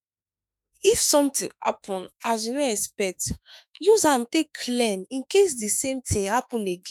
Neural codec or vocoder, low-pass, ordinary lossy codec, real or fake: autoencoder, 48 kHz, 32 numbers a frame, DAC-VAE, trained on Japanese speech; none; none; fake